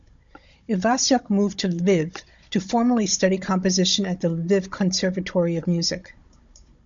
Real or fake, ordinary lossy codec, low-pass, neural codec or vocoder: fake; MP3, 64 kbps; 7.2 kHz; codec, 16 kHz, 16 kbps, FunCodec, trained on Chinese and English, 50 frames a second